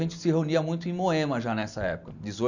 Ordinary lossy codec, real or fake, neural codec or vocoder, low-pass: none; real; none; 7.2 kHz